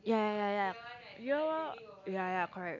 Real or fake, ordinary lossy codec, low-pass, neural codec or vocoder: real; none; 7.2 kHz; none